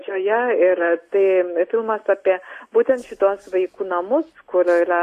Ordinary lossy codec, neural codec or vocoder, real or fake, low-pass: AAC, 48 kbps; none; real; 14.4 kHz